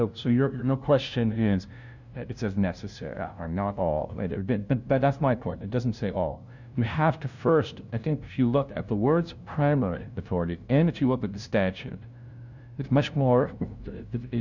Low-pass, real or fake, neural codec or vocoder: 7.2 kHz; fake; codec, 16 kHz, 0.5 kbps, FunCodec, trained on LibriTTS, 25 frames a second